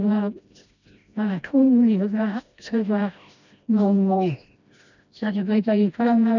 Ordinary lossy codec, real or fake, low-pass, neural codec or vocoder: none; fake; 7.2 kHz; codec, 16 kHz, 1 kbps, FreqCodec, smaller model